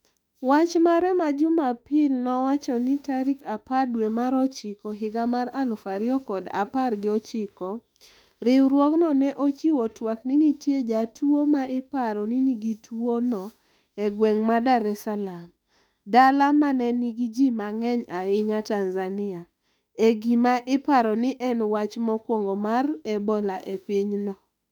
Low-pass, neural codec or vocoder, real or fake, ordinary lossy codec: 19.8 kHz; autoencoder, 48 kHz, 32 numbers a frame, DAC-VAE, trained on Japanese speech; fake; none